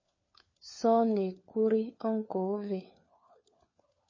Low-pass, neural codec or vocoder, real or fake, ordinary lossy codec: 7.2 kHz; codec, 16 kHz, 4 kbps, FunCodec, trained on LibriTTS, 50 frames a second; fake; MP3, 32 kbps